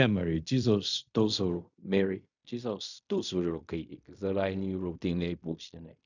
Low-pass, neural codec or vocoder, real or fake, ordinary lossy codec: 7.2 kHz; codec, 16 kHz in and 24 kHz out, 0.4 kbps, LongCat-Audio-Codec, fine tuned four codebook decoder; fake; none